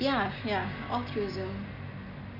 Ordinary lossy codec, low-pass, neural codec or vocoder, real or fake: none; 5.4 kHz; none; real